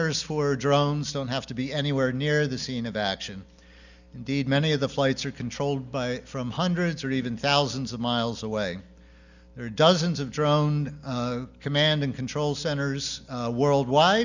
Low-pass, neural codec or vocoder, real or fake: 7.2 kHz; none; real